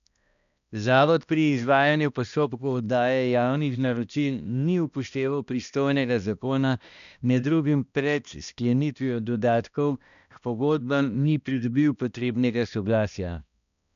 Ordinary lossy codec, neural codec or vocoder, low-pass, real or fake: none; codec, 16 kHz, 1 kbps, X-Codec, HuBERT features, trained on balanced general audio; 7.2 kHz; fake